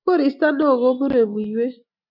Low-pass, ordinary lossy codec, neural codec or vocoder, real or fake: 5.4 kHz; MP3, 48 kbps; none; real